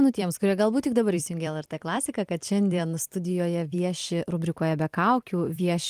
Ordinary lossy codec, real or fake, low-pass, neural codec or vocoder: Opus, 24 kbps; fake; 14.4 kHz; vocoder, 44.1 kHz, 128 mel bands every 256 samples, BigVGAN v2